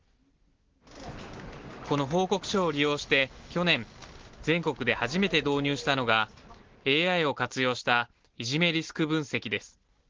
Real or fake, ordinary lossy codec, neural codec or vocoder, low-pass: real; Opus, 16 kbps; none; 7.2 kHz